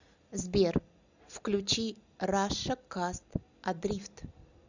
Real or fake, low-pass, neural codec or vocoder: real; 7.2 kHz; none